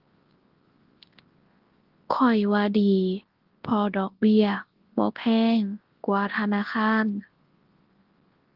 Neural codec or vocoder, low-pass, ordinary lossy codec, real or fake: codec, 24 kHz, 0.9 kbps, WavTokenizer, large speech release; 5.4 kHz; Opus, 16 kbps; fake